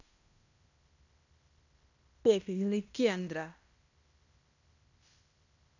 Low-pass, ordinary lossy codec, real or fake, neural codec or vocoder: 7.2 kHz; none; fake; codec, 16 kHz in and 24 kHz out, 0.9 kbps, LongCat-Audio-Codec, fine tuned four codebook decoder